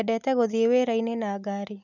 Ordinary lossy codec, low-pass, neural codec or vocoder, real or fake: none; 7.2 kHz; none; real